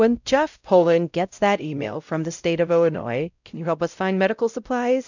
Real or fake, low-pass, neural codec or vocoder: fake; 7.2 kHz; codec, 16 kHz, 0.5 kbps, X-Codec, WavLM features, trained on Multilingual LibriSpeech